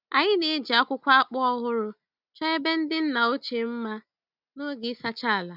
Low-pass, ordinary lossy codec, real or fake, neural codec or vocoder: 5.4 kHz; none; real; none